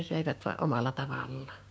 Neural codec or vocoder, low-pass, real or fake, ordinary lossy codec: codec, 16 kHz, 6 kbps, DAC; none; fake; none